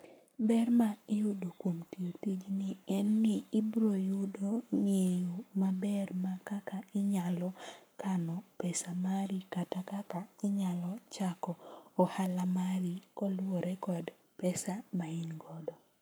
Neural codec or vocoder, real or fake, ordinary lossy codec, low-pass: codec, 44.1 kHz, 7.8 kbps, Pupu-Codec; fake; none; none